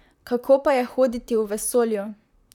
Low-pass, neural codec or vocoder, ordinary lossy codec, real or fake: 19.8 kHz; vocoder, 44.1 kHz, 128 mel bands, Pupu-Vocoder; none; fake